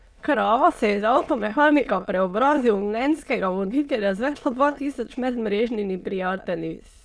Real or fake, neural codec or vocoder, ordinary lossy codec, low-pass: fake; autoencoder, 22.05 kHz, a latent of 192 numbers a frame, VITS, trained on many speakers; none; none